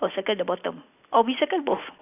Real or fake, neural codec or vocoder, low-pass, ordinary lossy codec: real; none; 3.6 kHz; none